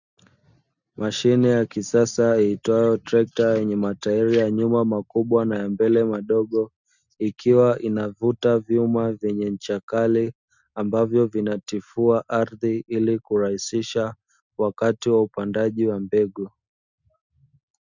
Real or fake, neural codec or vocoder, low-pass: real; none; 7.2 kHz